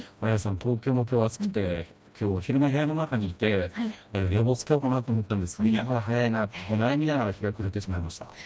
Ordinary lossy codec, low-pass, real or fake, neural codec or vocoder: none; none; fake; codec, 16 kHz, 1 kbps, FreqCodec, smaller model